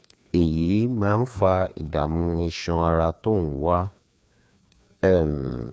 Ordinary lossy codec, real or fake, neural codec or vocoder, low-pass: none; fake; codec, 16 kHz, 2 kbps, FreqCodec, larger model; none